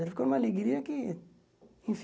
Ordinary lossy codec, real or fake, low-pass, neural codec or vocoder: none; real; none; none